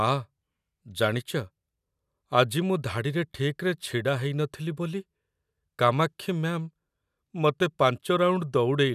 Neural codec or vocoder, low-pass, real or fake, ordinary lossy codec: none; 14.4 kHz; real; none